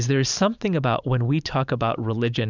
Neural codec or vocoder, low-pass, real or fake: vocoder, 44.1 kHz, 128 mel bands every 512 samples, BigVGAN v2; 7.2 kHz; fake